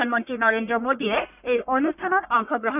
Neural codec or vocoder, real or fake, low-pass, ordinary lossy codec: codec, 44.1 kHz, 1.7 kbps, Pupu-Codec; fake; 3.6 kHz; none